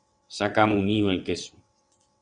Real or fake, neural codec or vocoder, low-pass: fake; vocoder, 22.05 kHz, 80 mel bands, WaveNeXt; 9.9 kHz